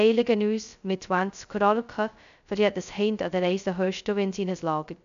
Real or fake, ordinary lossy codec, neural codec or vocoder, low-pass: fake; AAC, 96 kbps; codec, 16 kHz, 0.2 kbps, FocalCodec; 7.2 kHz